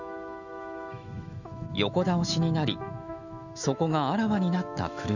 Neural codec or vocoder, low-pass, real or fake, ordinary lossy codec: autoencoder, 48 kHz, 128 numbers a frame, DAC-VAE, trained on Japanese speech; 7.2 kHz; fake; none